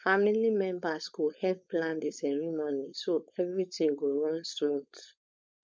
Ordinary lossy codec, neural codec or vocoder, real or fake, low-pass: none; codec, 16 kHz, 4.8 kbps, FACodec; fake; none